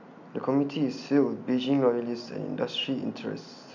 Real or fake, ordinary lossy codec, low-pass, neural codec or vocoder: real; none; 7.2 kHz; none